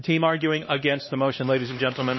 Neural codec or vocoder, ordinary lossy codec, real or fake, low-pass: codec, 16 kHz, 2 kbps, X-Codec, HuBERT features, trained on LibriSpeech; MP3, 24 kbps; fake; 7.2 kHz